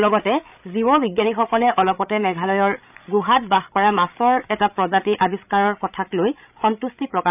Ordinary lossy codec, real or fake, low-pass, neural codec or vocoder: none; fake; 3.6 kHz; codec, 16 kHz, 16 kbps, FreqCodec, larger model